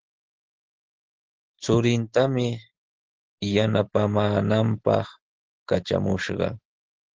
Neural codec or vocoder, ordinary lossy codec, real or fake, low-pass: none; Opus, 16 kbps; real; 7.2 kHz